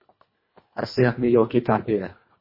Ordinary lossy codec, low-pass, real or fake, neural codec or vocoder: MP3, 24 kbps; 5.4 kHz; fake; codec, 24 kHz, 1.5 kbps, HILCodec